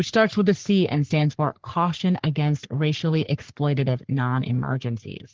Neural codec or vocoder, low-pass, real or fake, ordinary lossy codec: codec, 44.1 kHz, 3.4 kbps, Pupu-Codec; 7.2 kHz; fake; Opus, 16 kbps